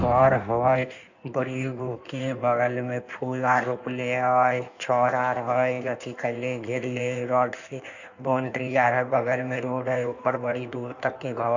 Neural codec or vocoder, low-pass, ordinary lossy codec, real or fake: codec, 16 kHz in and 24 kHz out, 1.1 kbps, FireRedTTS-2 codec; 7.2 kHz; none; fake